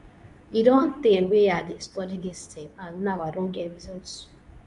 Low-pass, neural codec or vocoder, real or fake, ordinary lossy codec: 10.8 kHz; codec, 24 kHz, 0.9 kbps, WavTokenizer, medium speech release version 2; fake; MP3, 96 kbps